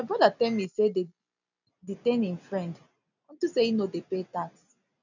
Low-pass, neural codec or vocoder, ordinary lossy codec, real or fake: 7.2 kHz; none; none; real